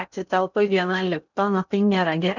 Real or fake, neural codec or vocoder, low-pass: fake; codec, 16 kHz in and 24 kHz out, 0.8 kbps, FocalCodec, streaming, 65536 codes; 7.2 kHz